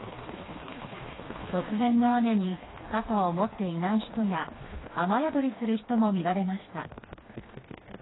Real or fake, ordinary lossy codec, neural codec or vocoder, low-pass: fake; AAC, 16 kbps; codec, 16 kHz, 2 kbps, FreqCodec, smaller model; 7.2 kHz